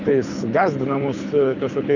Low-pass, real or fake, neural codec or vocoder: 7.2 kHz; fake; codec, 24 kHz, 6 kbps, HILCodec